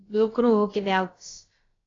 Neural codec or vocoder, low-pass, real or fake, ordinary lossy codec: codec, 16 kHz, about 1 kbps, DyCAST, with the encoder's durations; 7.2 kHz; fake; AAC, 32 kbps